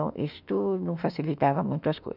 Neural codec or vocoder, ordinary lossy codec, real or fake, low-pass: autoencoder, 48 kHz, 32 numbers a frame, DAC-VAE, trained on Japanese speech; none; fake; 5.4 kHz